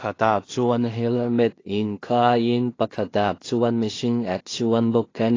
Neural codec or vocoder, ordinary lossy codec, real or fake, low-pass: codec, 16 kHz in and 24 kHz out, 0.4 kbps, LongCat-Audio-Codec, two codebook decoder; AAC, 32 kbps; fake; 7.2 kHz